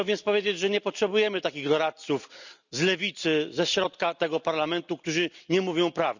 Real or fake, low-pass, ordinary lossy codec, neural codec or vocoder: real; 7.2 kHz; none; none